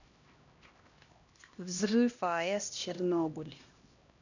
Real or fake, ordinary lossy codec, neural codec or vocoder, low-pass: fake; none; codec, 16 kHz, 1 kbps, X-Codec, HuBERT features, trained on LibriSpeech; 7.2 kHz